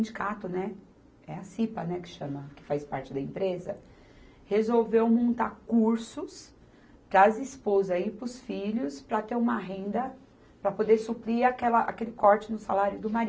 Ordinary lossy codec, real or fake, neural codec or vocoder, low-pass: none; real; none; none